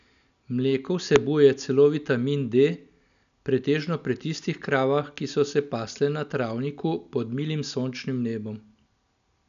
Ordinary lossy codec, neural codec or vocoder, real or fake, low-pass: none; none; real; 7.2 kHz